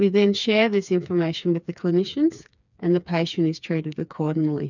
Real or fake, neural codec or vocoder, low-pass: fake; codec, 16 kHz, 4 kbps, FreqCodec, smaller model; 7.2 kHz